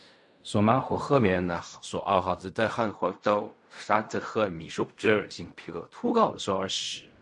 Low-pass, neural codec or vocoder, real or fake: 10.8 kHz; codec, 16 kHz in and 24 kHz out, 0.4 kbps, LongCat-Audio-Codec, fine tuned four codebook decoder; fake